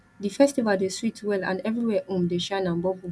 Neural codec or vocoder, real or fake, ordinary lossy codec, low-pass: none; real; none; none